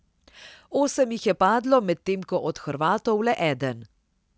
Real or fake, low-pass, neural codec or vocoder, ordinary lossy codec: real; none; none; none